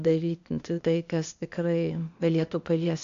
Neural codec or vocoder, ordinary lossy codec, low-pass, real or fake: codec, 16 kHz, 0.8 kbps, ZipCodec; AAC, 64 kbps; 7.2 kHz; fake